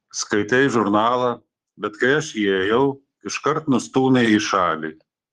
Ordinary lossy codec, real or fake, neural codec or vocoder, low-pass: Opus, 24 kbps; fake; codec, 44.1 kHz, 7.8 kbps, Pupu-Codec; 14.4 kHz